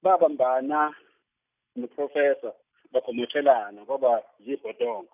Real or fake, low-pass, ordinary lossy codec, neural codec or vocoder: real; 3.6 kHz; none; none